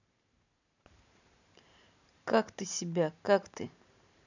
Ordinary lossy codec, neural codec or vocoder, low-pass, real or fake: none; vocoder, 44.1 kHz, 128 mel bands every 512 samples, BigVGAN v2; 7.2 kHz; fake